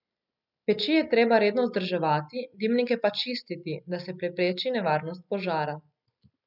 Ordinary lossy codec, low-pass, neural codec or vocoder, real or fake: none; 5.4 kHz; none; real